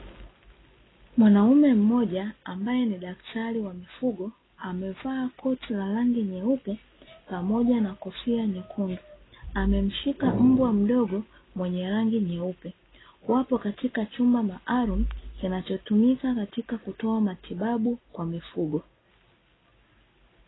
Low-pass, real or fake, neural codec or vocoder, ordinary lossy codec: 7.2 kHz; real; none; AAC, 16 kbps